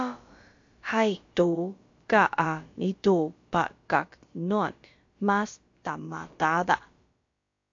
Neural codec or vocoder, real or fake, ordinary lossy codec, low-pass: codec, 16 kHz, about 1 kbps, DyCAST, with the encoder's durations; fake; AAC, 48 kbps; 7.2 kHz